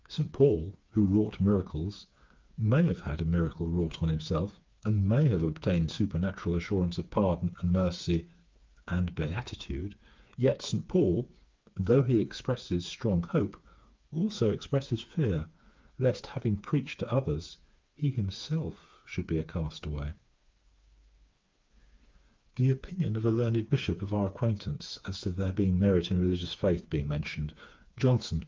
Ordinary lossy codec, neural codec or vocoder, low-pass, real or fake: Opus, 24 kbps; codec, 16 kHz, 4 kbps, FreqCodec, smaller model; 7.2 kHz; fake